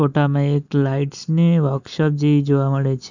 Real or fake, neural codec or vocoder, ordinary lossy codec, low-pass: real; none; none; 7.2 kHz